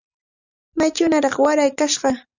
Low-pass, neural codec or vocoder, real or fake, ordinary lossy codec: 7.2 kHz; none; real; Opus, 64 kbps